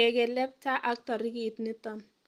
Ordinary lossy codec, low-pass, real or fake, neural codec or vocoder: Opus, 24 kbps; 14.4 kHz; real; none